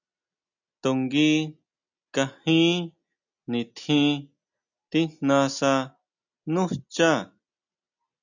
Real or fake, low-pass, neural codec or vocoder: real; 7.2 kHz; none